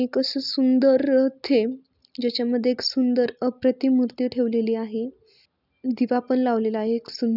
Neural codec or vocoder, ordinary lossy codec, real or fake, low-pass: none; none; real; 5.4 kHz